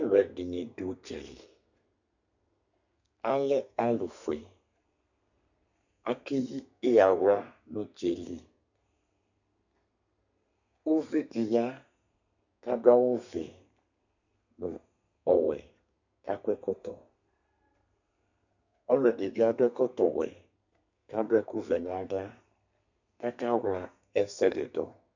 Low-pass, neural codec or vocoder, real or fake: 7.2 kHz; codec, 32 kHz, 1.9 kbps, SNAC; fake